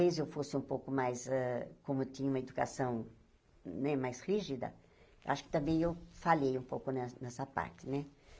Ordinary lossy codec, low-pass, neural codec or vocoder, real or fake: none; none; none; real